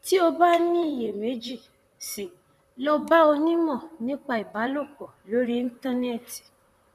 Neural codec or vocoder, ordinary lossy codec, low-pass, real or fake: vocoder, 44.1 kHz, 128 mel bands, Pupu-Vocoder; none; 14.4 kHz; fake